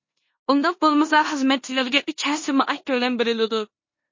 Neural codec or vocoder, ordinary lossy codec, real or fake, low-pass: codec, 16 kHz in and 24 kHz out, 0.9 kbps, LongCat-Audio-Codec, four codebook decoder; MP3, 32 kbps; fake; 7.2 kHz